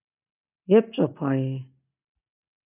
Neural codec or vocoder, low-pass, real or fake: none; 3.6 kHz; real